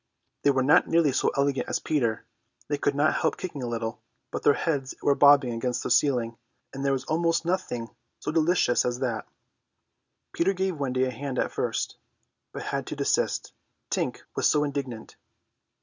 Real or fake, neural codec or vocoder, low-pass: real; none; 7.2 kHz